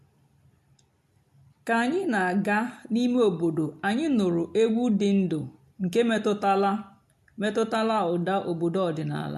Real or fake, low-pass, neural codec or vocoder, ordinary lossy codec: real; 14.4 kHz; none; MP3, 64 kbps